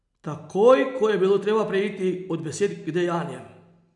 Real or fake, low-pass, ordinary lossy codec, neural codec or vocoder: real; 10.8 kHz; none; none